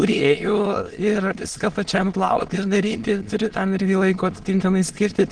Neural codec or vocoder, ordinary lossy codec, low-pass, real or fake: autoencoder, 22.05 kHz, a latent of 192 numbers a frame, VITS, trained on many speakers; Opus, 16 kbps; 9.9 kHz; fake